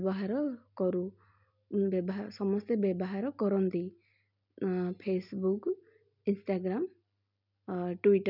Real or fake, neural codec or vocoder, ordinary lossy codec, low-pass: real; none; none; 5.4 kHz